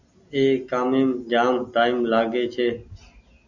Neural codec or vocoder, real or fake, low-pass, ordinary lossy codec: none; real; 7.2 kHz; Opus, 64 kbps